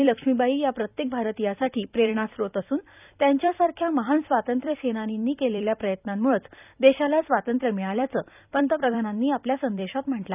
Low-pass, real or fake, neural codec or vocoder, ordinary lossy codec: 3.6 kHz; fake; vocoder, 44.1 kHz, 128 mel bands every 256 samples, BigVGAN v2; none